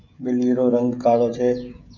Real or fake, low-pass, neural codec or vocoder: fake; 7.2 kHz; codec, 16 kHz, 16 kbps, FreqCodec, smaller model